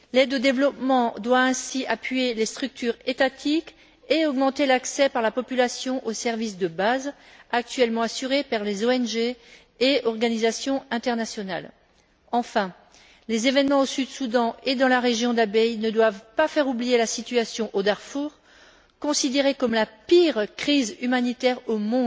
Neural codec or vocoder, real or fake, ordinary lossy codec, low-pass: none; real; none; none